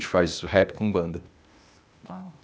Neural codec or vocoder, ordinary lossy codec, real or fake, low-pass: codec, 16 kHz, 0.8 kbps, ZipCodec; none; fake; none